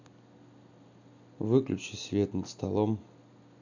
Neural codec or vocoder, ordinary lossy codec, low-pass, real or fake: none; none; 7.2 kHz; real